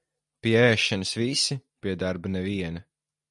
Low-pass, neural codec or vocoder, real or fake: 10.8 kHz; none; real